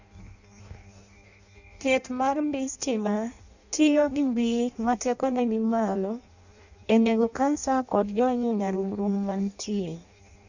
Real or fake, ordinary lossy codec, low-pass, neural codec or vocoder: fake; none; 7.2 kHz; codec, 16 kHz in and 24 kHz out, 0.6 kbps, FireRedTTS-2 codec